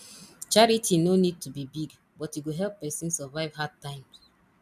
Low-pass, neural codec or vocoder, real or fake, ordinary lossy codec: 14.4 kHz; none; real; none